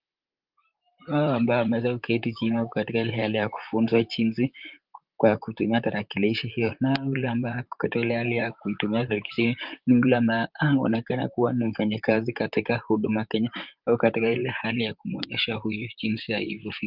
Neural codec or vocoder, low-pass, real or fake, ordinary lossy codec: vocoder, 44.1 kHz, 128 mel bands, Pupu-Vocoder; 5.4 kHz; fake; Opus, 24 kbps